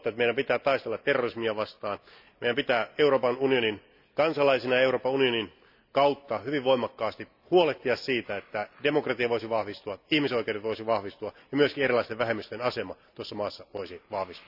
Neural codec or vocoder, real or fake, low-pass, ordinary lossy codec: none; real; 5.4 kHz; none